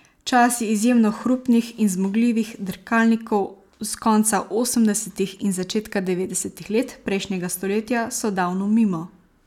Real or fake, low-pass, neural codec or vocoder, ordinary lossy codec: real; 19.8 kHz; none; none